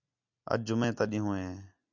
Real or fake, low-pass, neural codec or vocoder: real; 7.2 kHz; none